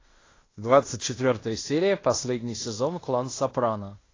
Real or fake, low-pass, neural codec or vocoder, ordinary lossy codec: fake; 7.2 kHz; codec, 16 kHz in and 24 kHz out, 0.9 kbps, LongCat-Audio-Codec, fine tuned four codebook decoder; AAC, 32 kbps